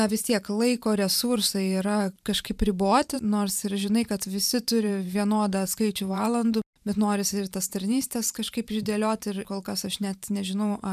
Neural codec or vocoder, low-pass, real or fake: vocoder, 44.1 kHz, 128 mel bands every 512 samples, BigVGAN v2; 14.4 kHz; fake